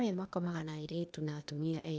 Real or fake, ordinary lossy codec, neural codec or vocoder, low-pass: fake; none; codec, 16 kHz, 0.8 kbps, ZipCodec; none